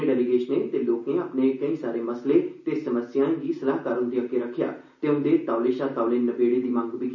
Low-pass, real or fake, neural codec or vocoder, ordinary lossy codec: 7.2 kHz; real; none; none